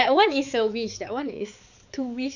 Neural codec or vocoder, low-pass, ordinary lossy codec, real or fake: codec, 16 kHz, 4 kbps, X-Codec, HuBERT features, trained on balanced general audio; 7.2 kHz; none; fake